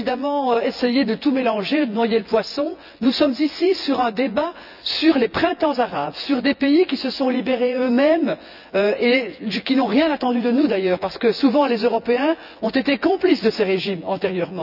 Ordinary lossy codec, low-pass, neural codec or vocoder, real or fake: none; 5.4 kHz; vocoder, 24 kHz, 100 mel bands, Vocos; fake